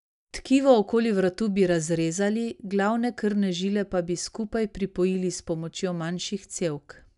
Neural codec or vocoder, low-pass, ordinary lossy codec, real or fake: none; 10.8 kHz; none; real